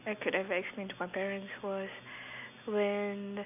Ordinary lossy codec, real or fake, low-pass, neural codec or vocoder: none; real; 3.6 kHz; none